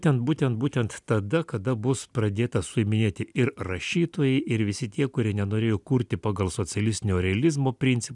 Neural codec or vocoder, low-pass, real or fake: none; 10.8 kHz; real